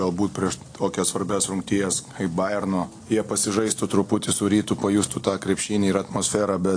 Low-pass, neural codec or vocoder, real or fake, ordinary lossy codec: 9.9 kHz; none; real; AAC, 48 kbps